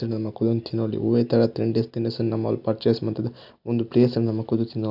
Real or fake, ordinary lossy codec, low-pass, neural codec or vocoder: real; none; 5.4 kHz; none